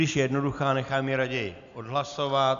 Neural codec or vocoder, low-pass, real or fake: none; 7.2 kHz; real